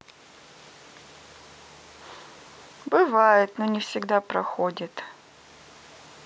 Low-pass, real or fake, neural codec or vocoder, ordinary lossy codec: none; real; none; none